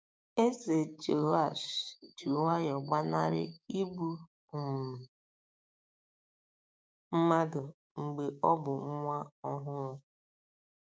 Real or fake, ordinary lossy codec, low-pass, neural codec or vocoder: fake; none; none; codec, 16 kHz, 6 kbps, DAC